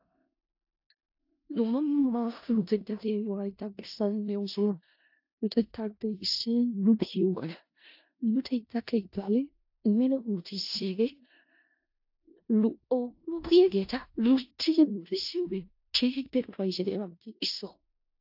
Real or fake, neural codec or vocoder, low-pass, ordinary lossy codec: fake; codec, 16 kHz in and 24 kHz out, 0.4 kbps, LongCat-Audio-Codec, four codebook decoder; 5.4 kHz; AAC, 48 kbps